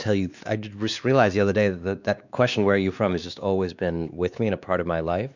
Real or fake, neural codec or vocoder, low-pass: fake; codec, 16 kHz, 2 kbps, X-Codec, WavLM features, trained on Multilingual LibriSpeech; 7.2 kHz